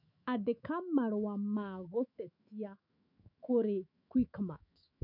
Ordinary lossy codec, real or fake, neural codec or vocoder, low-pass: none; fake; autoencoder, 48 kHz, 128 numbers a frame, DAC-VAE, trained on Japanese speech; 5.4 kHz